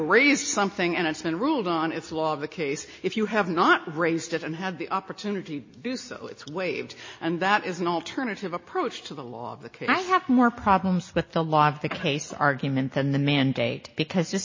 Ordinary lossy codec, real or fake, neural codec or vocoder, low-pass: MP3, 32 kbps; real; none; 7.2 kHz